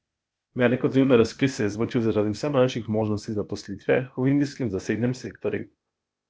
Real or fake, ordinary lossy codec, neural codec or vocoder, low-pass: fake; none; codec, 16 kHz, 0.8 kbps, ZipCodec; none